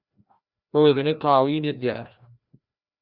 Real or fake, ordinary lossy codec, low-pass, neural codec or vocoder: fake; Opus, 64 kbps; 5.4 kHz; codec, 16 kHz, 1 kbps, FreqCodec, larger model